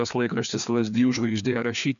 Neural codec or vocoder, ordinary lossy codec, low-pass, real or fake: codec, 16 kHz, 2 kbps, FreqCodec, larger model; MP3, 96 kbps; 7.2 kHz; fake